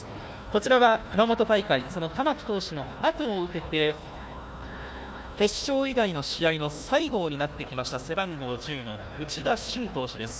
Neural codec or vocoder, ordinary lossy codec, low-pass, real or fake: codec, 16 kHz, 1 kbps, FunCodec, trained on Chinese and English, 50 frames a second; none; none; fake